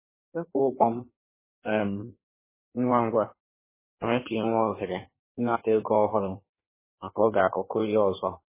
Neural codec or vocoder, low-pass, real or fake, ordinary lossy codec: codec, 16 kHz in and 24 kHz out, 1.1 kbps, FireRedTTS-2 codec; 3.6 kHz; fake; MP3, 16 kbps